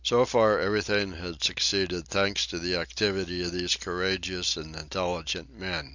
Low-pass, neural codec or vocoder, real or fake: 7.2 kHz; none; real